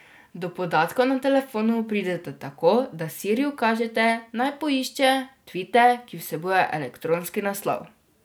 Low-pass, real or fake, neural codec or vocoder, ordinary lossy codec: none; real; none; none